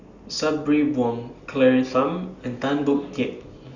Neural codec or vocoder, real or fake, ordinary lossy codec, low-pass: none; real; Opus, 64 kbps; 7.2 kHz